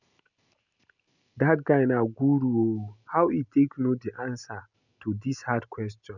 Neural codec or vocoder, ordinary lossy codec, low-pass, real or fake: none; none; 7.2 kHz; real